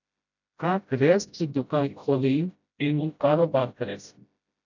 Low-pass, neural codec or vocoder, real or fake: 7.2 kHz; codec, 16 kHz, 0.5 kbps, FreqCodec, smaller model; fake